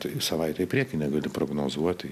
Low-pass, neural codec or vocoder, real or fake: 14.4 kHz; none; real